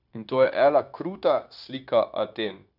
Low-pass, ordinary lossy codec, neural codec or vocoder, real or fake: 5.4 kHz; none; codec, 16 kHz, 0.9 kbps, LongCat-Audio-Codec; fake